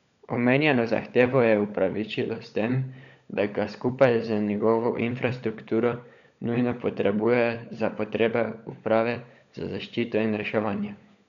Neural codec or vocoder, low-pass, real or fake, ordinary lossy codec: codec, 16 kHz, 16 kbps, FunCodec, trained on LibriTTS, 50 frames a second; 7.2 kHz; fake; none